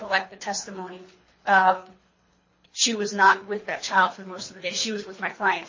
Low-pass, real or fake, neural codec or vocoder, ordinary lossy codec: 7.2 kHz; fake; codec, 24 kHz, 3 kbps, HILCodec; MP3, 32 kbps